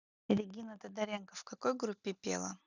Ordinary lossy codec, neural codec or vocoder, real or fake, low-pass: none; none; real; 7.2 kHz